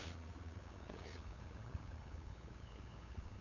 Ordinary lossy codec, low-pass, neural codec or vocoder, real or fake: none; 7.2 kHz; codec, 16 kHz, 8 kbps, FunCodec, trained on LibriTTS, 25 frames a second; fake